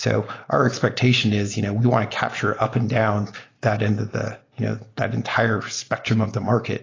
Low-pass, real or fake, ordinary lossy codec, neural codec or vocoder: 7.2 kHz; real; AAC, 32 kbps; none